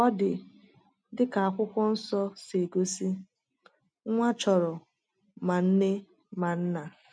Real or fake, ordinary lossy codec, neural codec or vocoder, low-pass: real; MP3, 64 kbps; none; 9.9 kHz